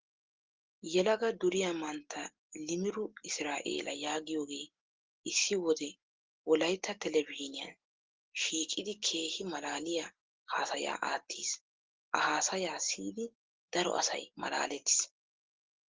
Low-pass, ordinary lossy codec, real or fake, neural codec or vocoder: 7.2 kHz; Opus, 16 kbps; real; none